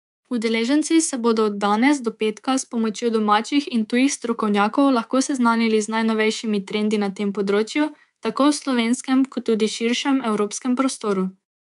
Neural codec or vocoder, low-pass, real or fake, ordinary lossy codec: codec, 24 kHz, 3.1 kbps, DualCodec; 10.8 kHz; fake; MP3, 96 kbps